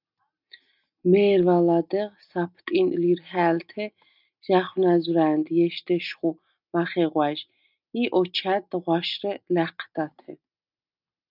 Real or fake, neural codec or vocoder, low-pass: real; none; 5.4 kHz